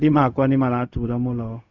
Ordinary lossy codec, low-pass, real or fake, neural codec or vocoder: none; 7.2 kHz; fake; codec, 16 kHz, 0.4 kbps, LongCat-Audio-Codec